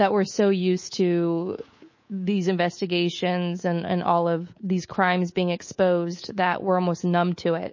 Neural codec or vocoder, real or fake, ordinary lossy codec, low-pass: codec, 24 kHz, 3.1 kbps, DualCodec; fake; MP3, 32 kbps; 7.2 kHz